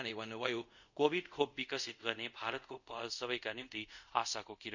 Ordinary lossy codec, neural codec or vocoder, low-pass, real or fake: none; codec, 24 kHz, 0.5 kbps, DualCodec; 7.2 kHz; fake